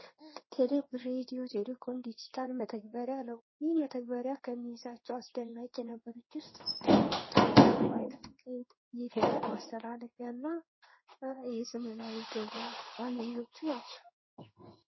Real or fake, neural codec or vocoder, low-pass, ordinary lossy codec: fake; codec, 16 kHz in and 24 kHz out, 1 kbps, XY-Tokenizer; 7.2 kHz; MP3, 24 kbps